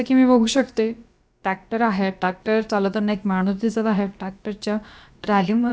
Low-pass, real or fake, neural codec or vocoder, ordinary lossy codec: none; fake; codec, 16 kHz, about 1 kbps, DyCAST, with the encoder's durations; none